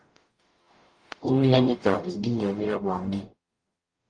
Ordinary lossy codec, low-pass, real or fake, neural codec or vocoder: Opus, 32 kbps; 9.9 kHz; fake; codec, 44.1 kHz, 0.9 kbps, DAC